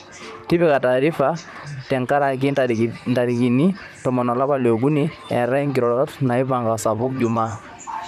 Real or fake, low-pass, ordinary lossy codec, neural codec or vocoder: fake; 14.4 kHz; none; vocoder, 44.1 kHz, 128 mel bands, Pupu-Vocoder